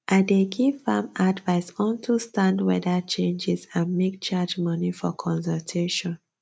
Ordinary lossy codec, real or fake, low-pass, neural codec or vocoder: none; real; none; none